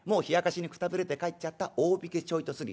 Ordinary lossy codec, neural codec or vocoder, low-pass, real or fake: none; none; none; real